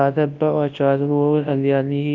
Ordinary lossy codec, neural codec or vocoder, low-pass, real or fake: Opus, 32 kbps; codec, 24 kHz, 0.9 kbps, WavTokenizer, large speech release; 7.2 kHz; fake